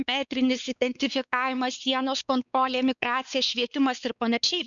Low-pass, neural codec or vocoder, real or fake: 7.2 kHz; codec, 16 kHz, 4 kbps, X-Codec, WavLM features, trained on Multilingual LibriSpeech; fake